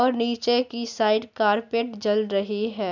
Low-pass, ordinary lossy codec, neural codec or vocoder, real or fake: 7.2 kHz; none; none; real